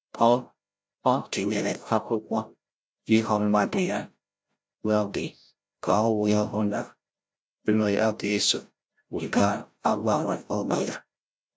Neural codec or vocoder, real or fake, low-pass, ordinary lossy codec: codec, 16 kHz, 0.5 kbps, FreqCodec, larger model; fake; none; none